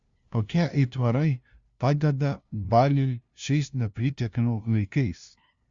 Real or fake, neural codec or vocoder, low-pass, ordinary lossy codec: fake; codec, 16 kHz, 0.5 kbps, FunCodec, trained on LibriTTS, 25 frames a second; 7.2 kHz; Opus, 64 kbps